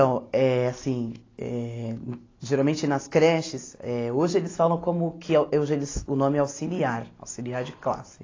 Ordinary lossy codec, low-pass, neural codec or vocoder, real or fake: AAC, 32 kbps; 7.2 kHz; none; real